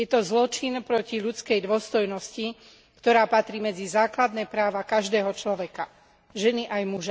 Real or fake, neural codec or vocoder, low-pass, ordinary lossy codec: real; none; none; none